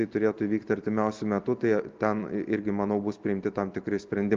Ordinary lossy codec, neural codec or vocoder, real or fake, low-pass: Opus, 32 kbps; none; real; 7.2 kHz